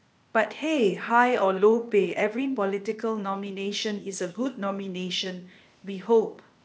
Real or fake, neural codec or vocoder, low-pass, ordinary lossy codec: fake; codec, 16 kHz, 0.8 kbps, ZipCodec; none; none